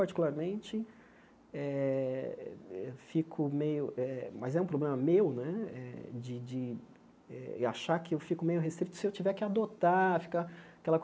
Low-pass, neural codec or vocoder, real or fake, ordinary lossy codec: none; none; real; none